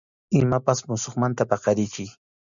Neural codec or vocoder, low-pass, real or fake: none; 7.2 kHz; real